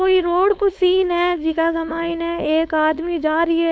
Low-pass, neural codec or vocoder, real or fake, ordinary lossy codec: none; codec, 16 kHz, 4.8 kbps, FACodec; fake; none